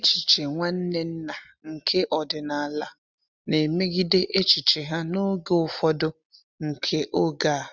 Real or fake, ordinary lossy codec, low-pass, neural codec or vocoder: real; none; 7.2 kHz; none